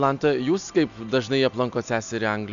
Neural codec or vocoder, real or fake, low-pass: none; real; 7.2 kHz